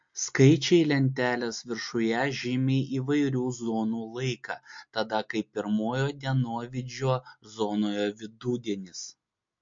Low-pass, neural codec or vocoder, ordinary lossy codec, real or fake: 7.2 kHz; none; MP3, 48 kbps; real